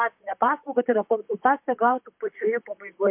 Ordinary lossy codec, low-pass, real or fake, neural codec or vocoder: MP3, 32 kbps; 3.6 kHz; fake; vocoder, 44.1 kHz, 128 mel bands, Pupu-Vocoder